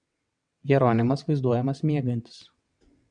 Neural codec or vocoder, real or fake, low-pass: vocoder, 22.05 kHz, 80 mel bands, WaveNeXt; fake; 9.9 kHz